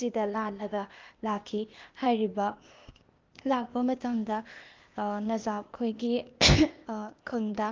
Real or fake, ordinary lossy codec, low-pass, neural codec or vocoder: fake; Opus, 32 kbps; 7.2 kHz; codec, 16 kHz, 0.8 kbps, ZipCodec